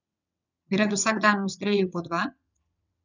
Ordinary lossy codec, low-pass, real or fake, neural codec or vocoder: none; 7.2 kHz; fake; vocoder, 22.05 kHz, 80 mel bands, WaveNeXt